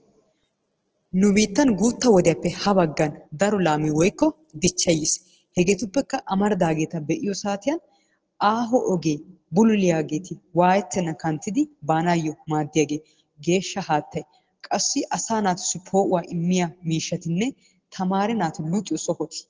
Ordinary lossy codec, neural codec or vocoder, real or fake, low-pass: Opus, 16 kbps; none; real; 7.2 kHz